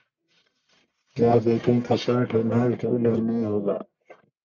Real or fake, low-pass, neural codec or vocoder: fake; 7.2 kHz; codec, 44.1 kHz, 1.7 kbps, Pupu-Codec